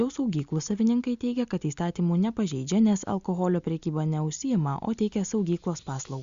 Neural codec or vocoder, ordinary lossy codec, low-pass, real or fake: none; Opus, 64 kbps; 7.2 kHz; real